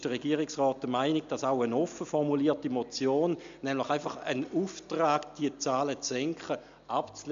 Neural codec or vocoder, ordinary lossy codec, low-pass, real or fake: none; MP3, 64 kbps; 7.2 kHz; real